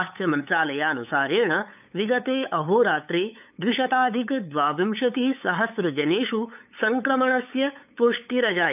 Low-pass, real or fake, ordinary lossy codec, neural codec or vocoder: 3.6 kHz; fake; none; codec, 16 kHz, 8 kbps, FunCodec, trained on LibriTTS, 25 frames a second